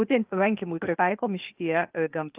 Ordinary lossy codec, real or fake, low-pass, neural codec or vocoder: Opus, 32 kbps; fake; 3.6 kHz; codec, 16 kHz, 0.8 kbps, ZipCodec